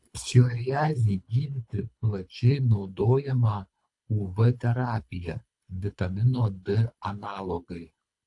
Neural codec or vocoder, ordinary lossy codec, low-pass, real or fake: codec, 24 kHz, 3 kbps, HILCodec; AAC, 64 kbps; 10.8 kHz; fake